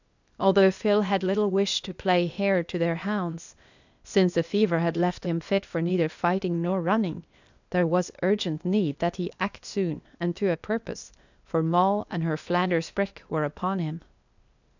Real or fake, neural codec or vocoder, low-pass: fake; codec, 16 kHz, 0.8 kbps, ZipCodec; 7.2 kHz